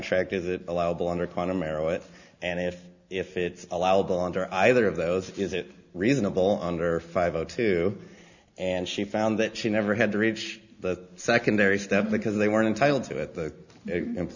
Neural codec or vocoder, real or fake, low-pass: none; real; 7.2 kHz